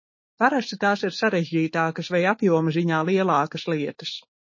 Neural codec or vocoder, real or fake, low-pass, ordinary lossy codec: autoencoder, 48 kHz, 128 numbers a frame, DAC-VAE, trained on Japanese speech; fake; 7.2 kHz; MP3, 32 kbps